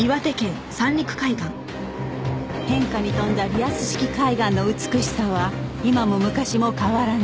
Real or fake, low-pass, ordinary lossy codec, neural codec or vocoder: real; none; none; none